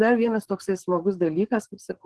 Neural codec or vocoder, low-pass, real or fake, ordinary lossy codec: codec, 44.1 kHz, 7.8 kbps, DAC; 10.8 kHz; fake; Opus, 16 kbps